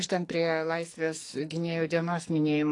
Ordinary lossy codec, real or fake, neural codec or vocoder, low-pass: AAC, 48 kbps; fake; codec, 44.1 kHz, 2.6 kbps, SNAC; 10.8 kHz